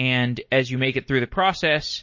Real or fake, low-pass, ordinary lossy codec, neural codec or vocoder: real; 7.2 kHz; MP3, 32 kbps; none